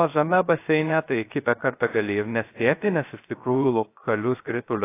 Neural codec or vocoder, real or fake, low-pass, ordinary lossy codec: codec, 16 kHz, 0.3 kbps, FocalCodec; fake; 3.6 kHz; AAC, 24 kbps